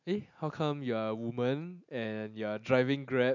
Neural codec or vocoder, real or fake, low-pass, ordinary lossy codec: none; real; 7.2 kHz; none